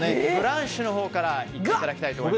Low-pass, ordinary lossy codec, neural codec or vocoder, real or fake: none; none; none; real